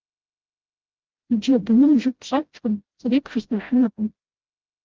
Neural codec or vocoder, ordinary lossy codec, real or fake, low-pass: codec, 16 kHz, 0.5 kbps, FreqCodec, smaller model; Opus, 16 kbps; fake; 7.2 kHz